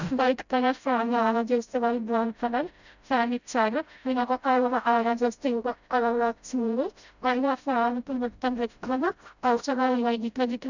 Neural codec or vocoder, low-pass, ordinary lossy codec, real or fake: codec, 16 kHz, 0.5 kbps, FreqCodec, smaller model; 7.2 kHz; none; fake